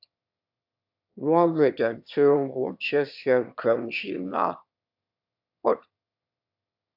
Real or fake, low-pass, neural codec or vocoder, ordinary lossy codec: fake; 5.4 kHz; autoencoder, 22.05 kHz, a latent of 192 numbers a frame, VITS, trained on one speaker; AAC, 48 kbps